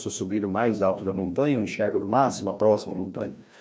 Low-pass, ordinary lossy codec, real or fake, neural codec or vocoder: none; none; fake; codec, 16 kHz, 1 kbps, FreqCodec, larger model